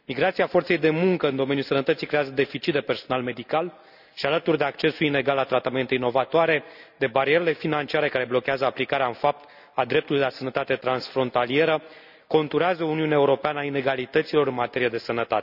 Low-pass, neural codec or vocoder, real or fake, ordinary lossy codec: 5.4 kHz; none; real; none